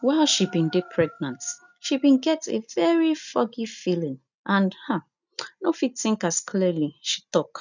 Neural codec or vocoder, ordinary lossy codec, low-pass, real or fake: none; none; 7.2 kHz; real